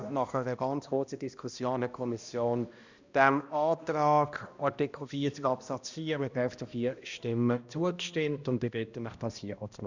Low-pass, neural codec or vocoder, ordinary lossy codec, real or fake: 7.2 kHz; codec, 16 kHz, 1 kbps, X-Codec, HuBERT features, trained on balanced general audio; Opus, 64 kbps; fake